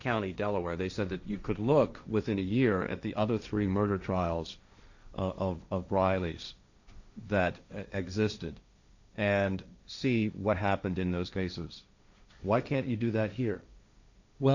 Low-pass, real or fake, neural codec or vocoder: 7.2 kHz; fake; codec, 16 kHz, 1.1 kbps, Voila-Tokenizer